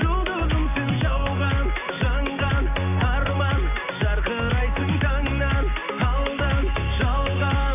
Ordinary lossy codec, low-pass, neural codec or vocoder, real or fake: none; 3.6 kHz; none; real